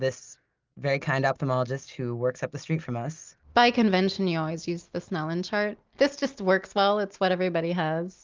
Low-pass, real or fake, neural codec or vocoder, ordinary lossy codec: 7.2 kHz; real; none; Opus, 24 kbps